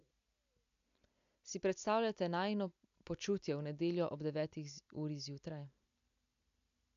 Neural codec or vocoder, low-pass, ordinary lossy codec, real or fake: none; 7.2 kHz; AAC, 64 kbps; real